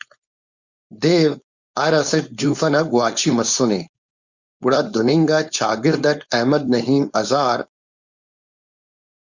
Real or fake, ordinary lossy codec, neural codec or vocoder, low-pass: fake; Opus, 64 kbps; codec, 16 kHz, 4.8 kbps, FACodec; 7.2 kHz